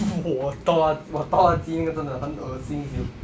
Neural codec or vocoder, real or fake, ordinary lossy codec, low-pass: none; real; none; none